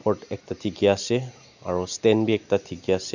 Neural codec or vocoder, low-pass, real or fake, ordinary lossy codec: none; 7.2 kHz; real; none